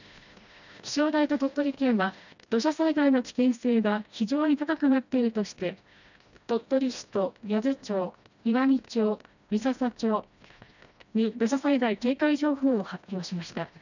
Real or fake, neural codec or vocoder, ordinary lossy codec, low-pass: fake; codec, 16 kHz, 1 kbps, FreqCodec, smaller model; none; 7.2 kHz